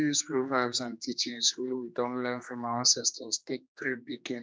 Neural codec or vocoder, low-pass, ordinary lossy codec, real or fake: codec, 16 kHz, 2 kbps, X-Codec, HuBERT features, trained on general audio; none; none; fake